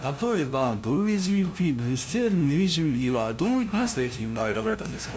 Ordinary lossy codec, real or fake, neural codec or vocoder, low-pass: none; fake; codec, 16 kHz, 0.5 kbps, FunCodec, trained on LibriTTS, 25 frames a second; none